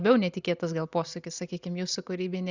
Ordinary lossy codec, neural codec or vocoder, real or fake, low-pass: Opus, 64 kbps; none; real; 7.2 kHz